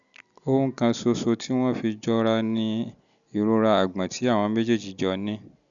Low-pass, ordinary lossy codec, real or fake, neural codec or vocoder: 7.2 kHz; none; real; none